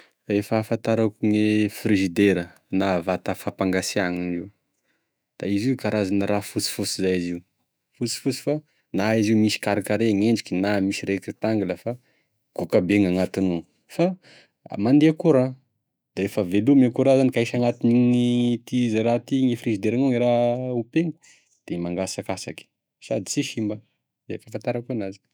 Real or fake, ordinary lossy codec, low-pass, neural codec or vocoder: fake; none; none; autoencoder, 48 kHz, 128 numbers a frame, DAC-VAE, trained on Japanese speech